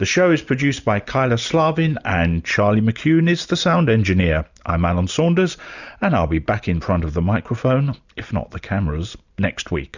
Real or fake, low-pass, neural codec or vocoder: real; 7.2 kHz; none